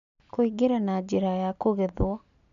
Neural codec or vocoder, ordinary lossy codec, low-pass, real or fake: none; none; 7.2 kHz; real